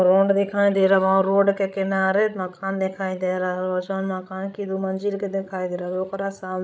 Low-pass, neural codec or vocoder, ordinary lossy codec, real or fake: none; codec, 16 kHz, 16 kbps, FreqCodec, larger model; none; fake